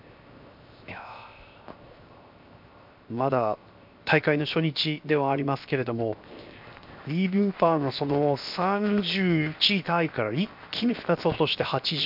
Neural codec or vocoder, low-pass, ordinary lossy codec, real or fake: codec, 16 kHz, 0.7 kbps, FocalCodec; 5.4 kHz; MP3, 48 kbps; fake